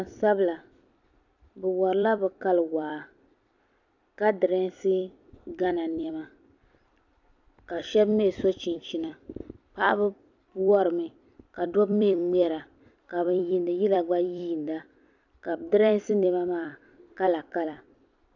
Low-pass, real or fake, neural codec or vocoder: 7.2 kHz; fake; vocoder, 44.1 kHz, 128 mel bands every 256 samples, BigVGAN v2